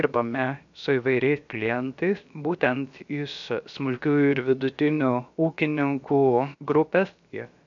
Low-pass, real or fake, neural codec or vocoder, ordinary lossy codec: 7.2 kHz; fake; codec, 16 kHz, about 1 kbps, DyCAST, with the encoder's durations; MP3, 64 kbps